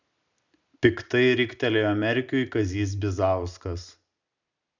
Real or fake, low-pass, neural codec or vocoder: real; 7.2 kHz; none